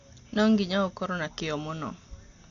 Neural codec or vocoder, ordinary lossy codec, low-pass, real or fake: none; AAC, 48 kbps; 7.2 kHz; real